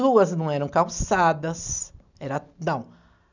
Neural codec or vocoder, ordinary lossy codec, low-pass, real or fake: none; none; 7.2 kHz; real